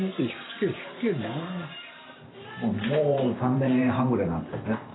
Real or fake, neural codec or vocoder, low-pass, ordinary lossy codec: fake; vocoder, 44.1 kHz, 128 mel bands every 512 samples, BigVGAN v2; 7.2 kHz; AAC, 16 kbps